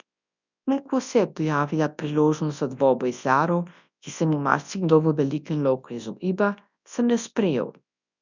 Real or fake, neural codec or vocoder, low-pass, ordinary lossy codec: fake; codec, 24 kHz, 0.9 kbps, WavTokenizer, large speech release; 7.2 kHz; none